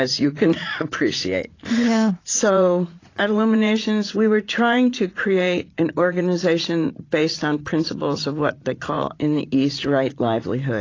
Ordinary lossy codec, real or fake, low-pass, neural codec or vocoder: AAC, 32 kbps; fake; 7.2 kHz; vocoder, 44.1 kHz, 80 mel bands, Vocos